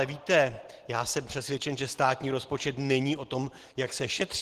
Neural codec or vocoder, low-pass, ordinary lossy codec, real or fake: none; 14.4 kHz; Opus, 16 kbps; real